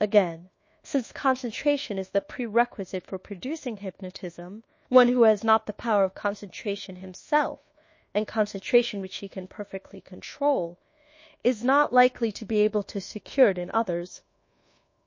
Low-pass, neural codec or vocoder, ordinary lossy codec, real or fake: 7.2 kHz; codec, 24 kHz, 1.2 kbps, DualCodec; MP3, 32 kbps; fake